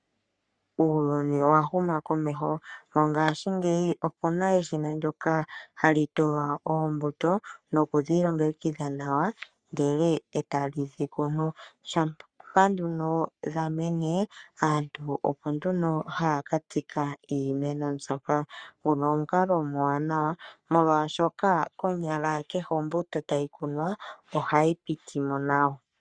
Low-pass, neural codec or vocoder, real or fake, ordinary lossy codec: 9.9 kHz; codec, 44.1 kHz, 3.4 kbps, Pupu-Codec; fake; Opus, 64 kbps